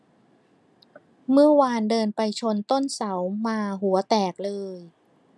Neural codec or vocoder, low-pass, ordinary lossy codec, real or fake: none; none; none; real